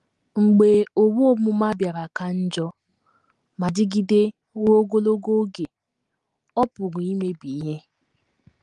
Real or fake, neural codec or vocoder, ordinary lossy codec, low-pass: real; none; Opus, 24 kbps; 10.8 kHz